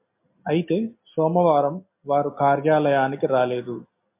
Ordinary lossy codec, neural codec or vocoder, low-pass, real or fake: MP3, 32 kbps; none; 3.6 kHz; real